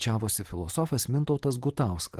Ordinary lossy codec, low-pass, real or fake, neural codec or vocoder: Opus, 16 kbps; 14.4 kHz; real; none